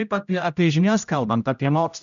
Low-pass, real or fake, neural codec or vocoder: 7.2 kHz; fake; codec, 16 kHz, 0.5 kbps, X-Codec, HuBERT features, trained on general audio